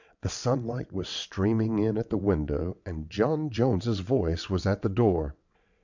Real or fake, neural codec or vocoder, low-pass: fake; vocoder, 22.05 kHz, 80 mel bands, WaveNeXt; 7.2 kHz